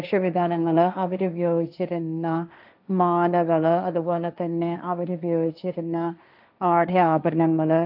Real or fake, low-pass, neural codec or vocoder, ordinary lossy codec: fake; 5.4 kHz; codec, 16 kHz, 1.1 kbps, Voila-Tokenizer; none